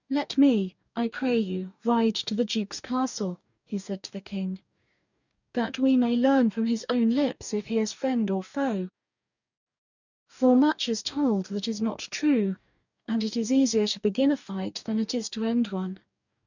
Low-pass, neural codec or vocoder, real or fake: 7.2 kHz; codec, 44.1 kHz, 2.6 kbps, DAC; fake